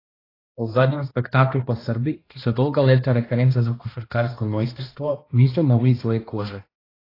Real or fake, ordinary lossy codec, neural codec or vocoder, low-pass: fake; AAC, 24 kbps; codec, 16 kHz, 1 kbps, X-Codec, HuBERT features, trained on balanced general audio; 5.4 kHz